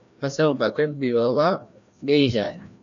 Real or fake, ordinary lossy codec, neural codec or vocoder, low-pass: fake; AAC, 64 kbps; codec, 16 kHz, 1 kbps, FreqCodec, larger model; 7.2 kHz